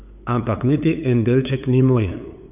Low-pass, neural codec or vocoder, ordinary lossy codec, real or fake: 3.6 kHz; codec, 16 kHz, 8 kbps, FunCodec, trained on LibriTTS, 25 frames a second; none; fake